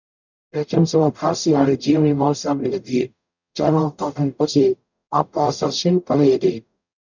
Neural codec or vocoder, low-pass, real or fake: codec, 44.1 kHz, 0.9 kbps, DAC; 7.2 kHz; fake